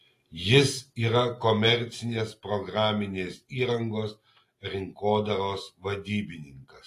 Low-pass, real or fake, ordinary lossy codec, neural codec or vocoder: 14.4 kHz; real; AAC, 48 kbps; none